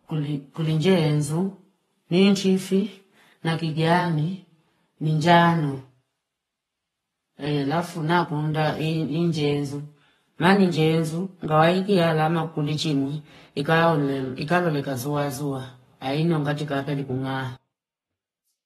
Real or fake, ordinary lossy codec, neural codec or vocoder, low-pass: fake; AAC, 32 kbps; codec, 44.1 kHz, 7.8 kbps, Pupu-Codec; 19.8 kHz